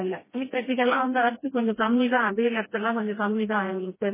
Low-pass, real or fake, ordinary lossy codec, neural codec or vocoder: 3.6 kHz; fake; MP3, 16 kbps; codec, 16 kHz, 1 kbps, FreqCodec, smaller model